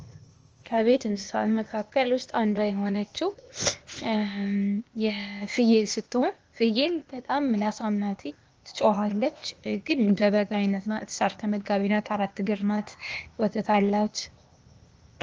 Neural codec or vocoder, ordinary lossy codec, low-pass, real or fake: codec, 16 kHz, 0.8 kbps, ZipCodec; Opus, 24 kbps; 7.2 kHz; fake